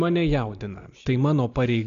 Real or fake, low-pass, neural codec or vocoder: real; 7.2 kHz; none